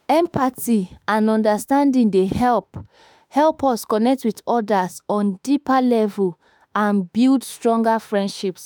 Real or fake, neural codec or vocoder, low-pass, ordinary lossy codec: fake; autoencoder, 48 kHz, 32 numbers a frame, DAC-VAE, trained on Japanese speech; none; none